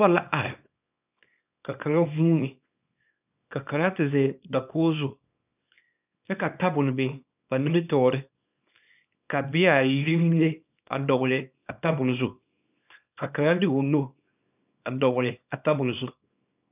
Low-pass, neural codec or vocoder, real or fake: 3.6 kHz; codec, 24 kHz, 0.9 kbps, WavTokenizer, small release; fake